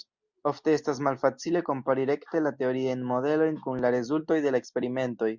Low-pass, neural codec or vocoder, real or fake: 7.2 kHz; none; real